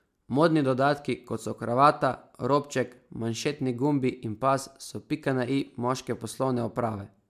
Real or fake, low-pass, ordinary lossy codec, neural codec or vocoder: real; 14.4 kHz; MP3, 96 kbps; none